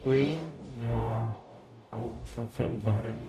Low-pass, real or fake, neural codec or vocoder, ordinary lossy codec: 14.4 kHz; fake; codec, 44.1 kHz, 0.9 kbps, DAC; MP3, 64 kbps